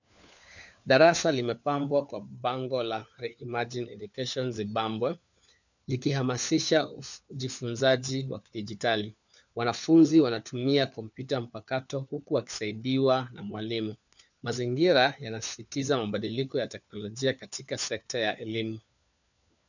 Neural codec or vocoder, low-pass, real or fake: codec, 16 kHz, 4 kbps, FunCodec, trained on LibriTTS, 50 frames a second; 7.2 kHz; fake